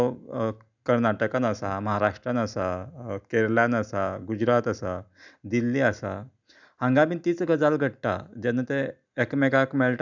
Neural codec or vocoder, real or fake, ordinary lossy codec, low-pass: none; real; none; 7.2 kHz